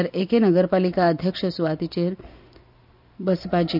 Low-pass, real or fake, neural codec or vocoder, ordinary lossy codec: 5.4 kHz; real; none; none